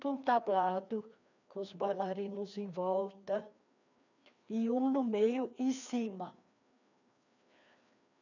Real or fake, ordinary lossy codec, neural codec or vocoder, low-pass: fake; none; codec, 16 kHz, 2 kbps, FreqCodec, larger model; 7.2 kHz